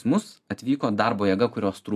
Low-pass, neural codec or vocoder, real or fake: 14.4 kHz; none; real